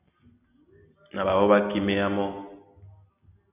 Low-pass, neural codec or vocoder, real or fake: 3.6 kHz; none; real